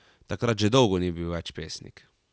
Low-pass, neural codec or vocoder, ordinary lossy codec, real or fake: none; none; none; real